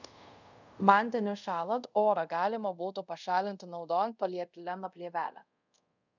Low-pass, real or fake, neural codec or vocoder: 7.2 kHz; fake; codec, 24 kHz, 0.5 kbps, DualCodec